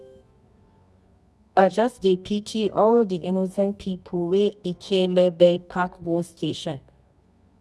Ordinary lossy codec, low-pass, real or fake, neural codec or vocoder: none; none; fake; codec, 24 kHz, 0.9 kbps, WavTokenizer, medium music audio release